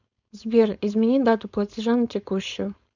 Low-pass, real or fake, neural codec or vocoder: 7.2 kHz; fake; codec, 16 kHz, 4.8 kbps, FACodec